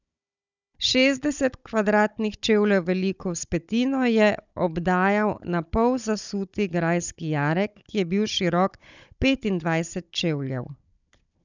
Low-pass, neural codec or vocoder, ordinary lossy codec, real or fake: 7.2 kHz; codec, 16 kHz, 16 kbps, FunCodec, trained on Chinese and English, 50 frames a second; none; fake